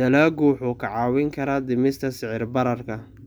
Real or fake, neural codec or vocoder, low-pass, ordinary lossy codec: real; none; none; none